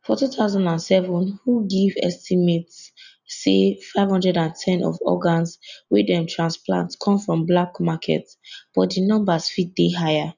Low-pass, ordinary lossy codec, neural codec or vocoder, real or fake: 7.2 kHz; none; none; real